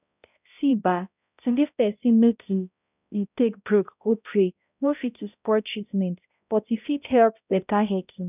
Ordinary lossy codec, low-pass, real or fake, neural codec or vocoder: none; 3.6 kHz; fake; codec, 16 kHz, 0.5 kbps, X-Codec, HuBERT features, trained on balanced general audio